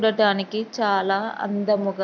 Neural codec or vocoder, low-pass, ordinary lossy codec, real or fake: none; 7.2 kHz; none; real